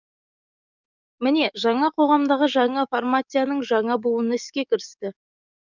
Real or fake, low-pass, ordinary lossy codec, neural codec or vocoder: fake; 7.2 kHz; none; codec, 44.1 kHz, 7.8 kbps, DAC